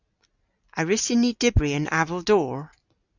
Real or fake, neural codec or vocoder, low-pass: real; none; 7.2 kHz